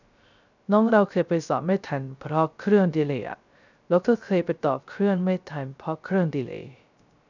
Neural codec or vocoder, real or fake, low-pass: codec, 16 kHz, 0.3 kbps, FocalCodec; fake; 7.2 kHz